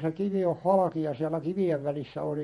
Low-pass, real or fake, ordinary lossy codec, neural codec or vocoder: 19.8 kHz; fake; MP3, 48 kbps; vocoder, 48 kHz, 128 mel bands, Vocos